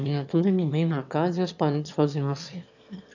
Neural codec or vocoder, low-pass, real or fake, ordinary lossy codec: autoencoder, 22.05 kHz, a latent of 192 numbers a frame, VITS, trained on one speaker; 7.2 kHz; fake; none